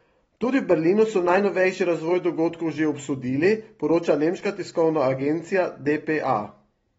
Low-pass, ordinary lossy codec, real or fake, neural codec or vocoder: 19.8 kHz; AAC, 24 kbps; real; none